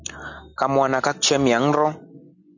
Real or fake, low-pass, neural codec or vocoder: real; 7.2 kHz; none